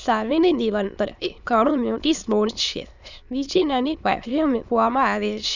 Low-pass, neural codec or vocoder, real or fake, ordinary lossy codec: 7.2 kHz; autoencoder, 22.05 kHz, a latent of 192 numbers a frame, VITS, trained on many speakers; fake; none